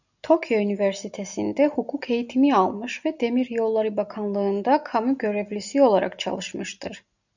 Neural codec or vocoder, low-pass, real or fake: none; 7.2 kHz; real